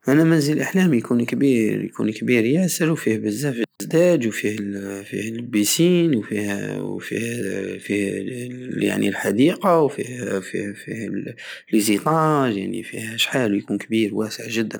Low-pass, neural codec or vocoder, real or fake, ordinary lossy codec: none; none; real; none